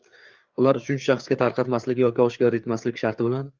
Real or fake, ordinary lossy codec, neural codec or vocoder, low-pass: fake; Opus, 32 kbps; codec, 16 kHz, 4 kbps, FreqCodec, larger model; 7.2 kHz